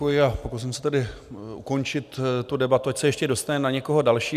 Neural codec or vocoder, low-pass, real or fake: none; 14.4 kHz; real